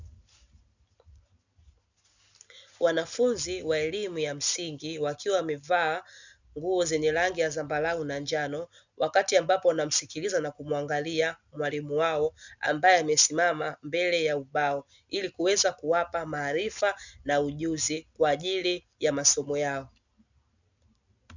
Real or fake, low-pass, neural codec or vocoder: real; 7.2 kHz; none